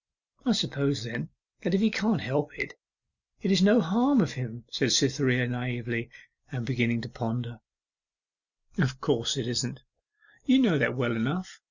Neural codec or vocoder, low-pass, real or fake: none; 7.2 kHz; real